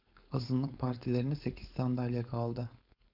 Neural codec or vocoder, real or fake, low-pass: codec, 16 kHz, 4.8 kbps, FACodec; fake; 5.4 kHz